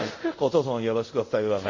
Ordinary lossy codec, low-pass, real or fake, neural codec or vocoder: MP3, 32 kbps; 7.2 kHz; fake; codec, 24 kHz, 0.5 kbps, DualCodec